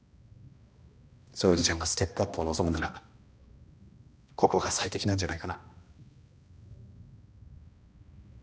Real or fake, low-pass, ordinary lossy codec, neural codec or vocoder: fake; none; none; codec, 16 kHz, 1 kbps, X-Codec, HuBERT features, trained on balanced general audio